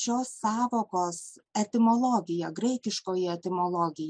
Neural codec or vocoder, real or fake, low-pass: none; real; 9.9 kHz